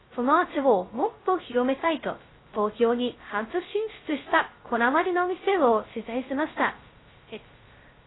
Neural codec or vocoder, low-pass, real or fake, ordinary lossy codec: codec, 16 kHz, 0.2 kbps, FocalCodec; 7.2 kHz; fake; AAC, 16 kbps